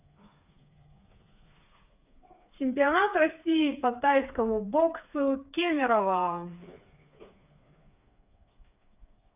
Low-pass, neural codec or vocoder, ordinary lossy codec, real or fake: 3.6 kHz; codec, 16 kHz, 4 kbps, FreqCodec, smaller model; none; fake